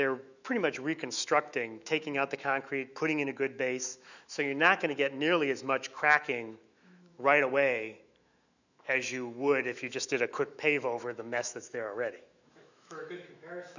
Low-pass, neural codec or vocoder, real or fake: 7.2 kHz; autoencoder, 48 kHz, 128 numbers a frame, DAC-VAE, trained on Japanese speech; fake